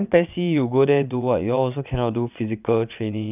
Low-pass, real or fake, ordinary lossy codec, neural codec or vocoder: 3.6 kHz; fake; none; vocoder, 22.05 kHz, 80 mel bands, WaveNeXt